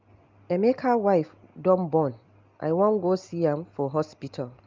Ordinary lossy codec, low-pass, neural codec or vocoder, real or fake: Opus, 24 kbps; 7.2 kHz; none; real